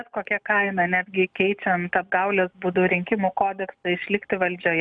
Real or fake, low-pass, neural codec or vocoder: real; 9.9 kHz; none